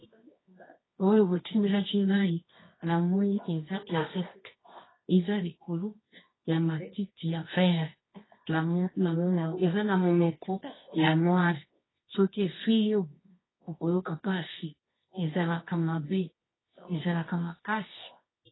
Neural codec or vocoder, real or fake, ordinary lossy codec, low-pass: codec, 24 kHz, 0.9 kbps, WavTokenizer, medium music audio release; fake; AAC, 16 kbps; 7.2 kHz